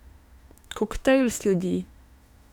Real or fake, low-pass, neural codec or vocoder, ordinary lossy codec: fake; 19.8 kHz; autoencoder, 48 kHz, 32 numbers a frame, DAC-VAE, trained on Japanese speech; none